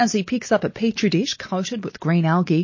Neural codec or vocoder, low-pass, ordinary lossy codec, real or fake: none; 7.2 kHz; MP3, 32 kbps; real